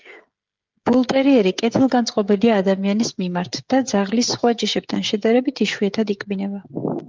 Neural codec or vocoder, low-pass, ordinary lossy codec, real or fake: codec, 16 kHz, 16 kbps, FreqCodec, smaller model; 7.2 kHz; Opus, 32 kbps; fake